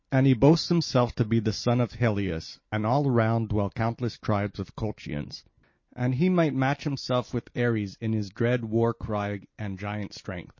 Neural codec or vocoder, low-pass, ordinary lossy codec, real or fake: none; 7.2 kHz; MP3, 32 kbps; real